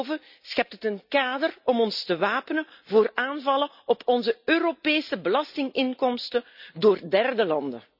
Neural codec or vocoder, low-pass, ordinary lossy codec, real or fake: none; 5.4 kHz; none; real